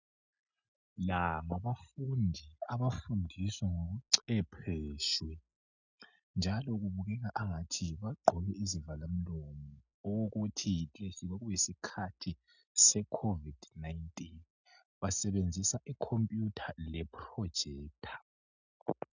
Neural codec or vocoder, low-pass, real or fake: none; 7.2 kHz; real